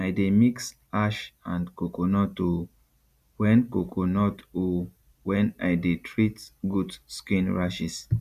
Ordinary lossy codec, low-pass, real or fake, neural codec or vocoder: none; 14.4 kHz; real; none